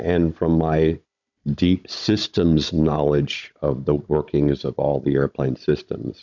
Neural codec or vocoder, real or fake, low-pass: codec, 16 kHz, 16 kbps, FunCodec, trained on Chinese and English, 50 frames a second; fake; 7.2 kHz